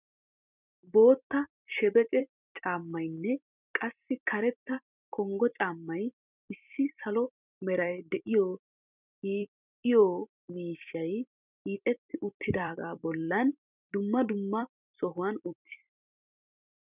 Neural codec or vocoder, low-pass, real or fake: vocoder, 44.1 kHz, 128 mel bands every 256 samples, BigVGAN v2; 3.6 kHz; fake